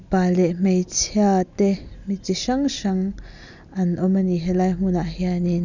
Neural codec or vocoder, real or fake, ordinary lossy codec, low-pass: none; real; none; 7.2 kHz